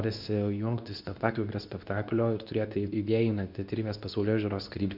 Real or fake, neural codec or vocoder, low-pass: fake; codec, 24 kHz, 0.9 kbps, WavTokenizer, medium speech release version 2; 5.4 kHz